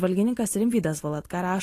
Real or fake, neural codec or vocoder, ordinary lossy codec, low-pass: real; none; AAC, 48 kbps; 14.4 kHz